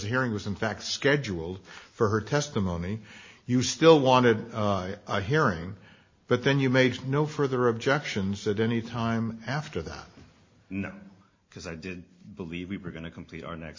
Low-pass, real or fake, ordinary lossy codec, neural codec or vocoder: 7.2 kHz; real; MP3, 32 kbps; none